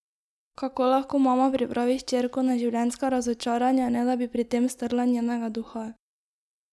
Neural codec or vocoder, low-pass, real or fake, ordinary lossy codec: none; none; real; none